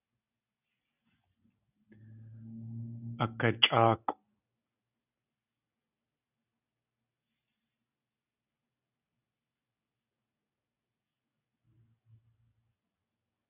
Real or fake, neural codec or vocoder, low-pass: real; none; 3.6 kHz